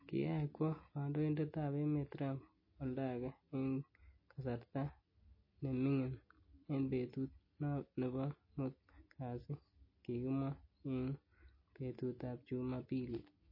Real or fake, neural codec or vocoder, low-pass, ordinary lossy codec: real; none; 5.4 kHz; MP3, 24 kbps